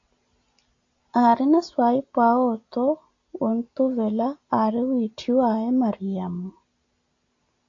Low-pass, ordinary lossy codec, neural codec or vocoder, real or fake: 7.2 kHz; AAC, 48 kbps; none; real